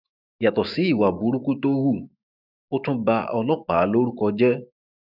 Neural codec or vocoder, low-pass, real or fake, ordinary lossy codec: autoencoder, 48 kHz, 128 numbers a frame, DAC-VAE, trained on Japanese speech; 5.4 kHz; fake; none